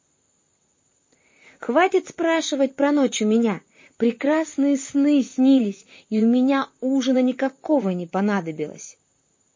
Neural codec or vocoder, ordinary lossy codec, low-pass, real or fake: vocoder, 44.1 kHz, 128 mel bands, Pupu-Vocoder; MP3, 32 kbps; 7.2 kHz; fake